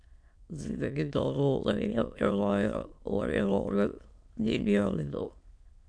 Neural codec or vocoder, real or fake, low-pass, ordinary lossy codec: autoencoder, 22.05 kHz, a latent of 192 numbers a frame, VITS, trained on many speakers; fake; 9.9 kHz; MP3, 64 kbps